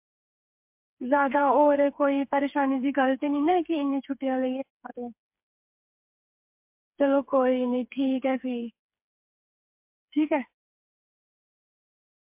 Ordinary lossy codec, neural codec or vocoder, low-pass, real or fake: MP3, 32 kbps; codec, 16 kHz, 4 kbps, FreqCodec, smaller model; 3.6 kHz; fake